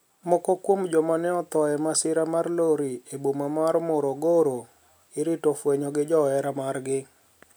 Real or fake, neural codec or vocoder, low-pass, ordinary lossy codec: real; none; none; none